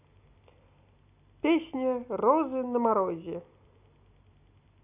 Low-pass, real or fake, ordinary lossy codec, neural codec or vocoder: 3.6 kHz; real; none; none